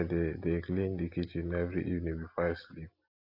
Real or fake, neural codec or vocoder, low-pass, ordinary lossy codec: real; none; 5.4 kHz; AAC, 32 kbps